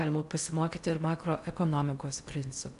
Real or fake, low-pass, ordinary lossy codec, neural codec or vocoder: fake; 10.8 kHz; MP3, 96 kbps; codec, 16 kHz in and 24 kHz out, 0.6 kbps, FocalCodec, streaming, 4096 codes